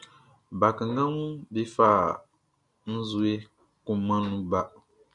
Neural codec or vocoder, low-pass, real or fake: none; 10.8 kHz; real